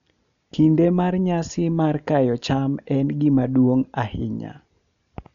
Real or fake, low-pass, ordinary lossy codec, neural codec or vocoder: real; 7.2 kHz; none; none